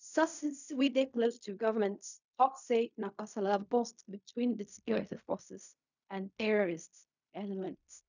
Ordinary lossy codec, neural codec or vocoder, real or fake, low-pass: none; codec, 16 kHz in and 24 kHz out, 0.4 kbps, LongCat-Audio-Codec, fine tuned four codebook decoder; fake; 7.2 kHz